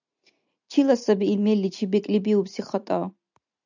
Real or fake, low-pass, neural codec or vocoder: real; 7.2 kHz; none